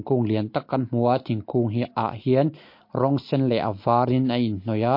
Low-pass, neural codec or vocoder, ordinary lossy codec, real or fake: 5.4 kHz; none; MP3, 32 kbps; real